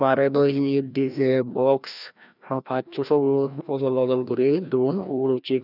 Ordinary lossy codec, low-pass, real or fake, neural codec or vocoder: none; 5.4 kHz; fake; codec, 16 kHz, 1 kbps, FreqCodec, larger model